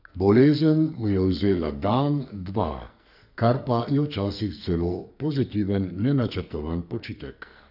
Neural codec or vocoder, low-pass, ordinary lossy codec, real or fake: codec, 44.1 kHz, 2.6 kbps, SNAC; 5.4 kHz; none; fake